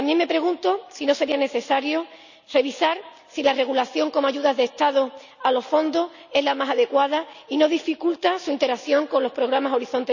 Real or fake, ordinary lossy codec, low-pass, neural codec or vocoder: real; none; 7.2 kHz; none